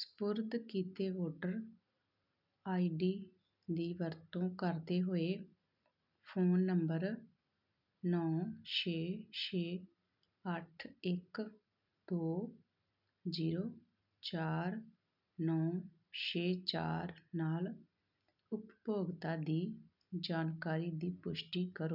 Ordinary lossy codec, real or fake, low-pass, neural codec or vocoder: none; real; 5.4 kHz; none